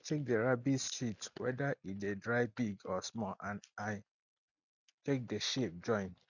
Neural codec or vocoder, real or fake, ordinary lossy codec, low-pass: none; real; none; 7.2 kHz